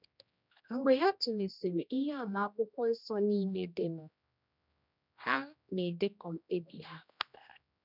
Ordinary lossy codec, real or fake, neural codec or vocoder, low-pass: none; fake; codec, 16 kHz, 1 kbps, X-Codec, HuBERT features, trained on general audio; 5.4 kHz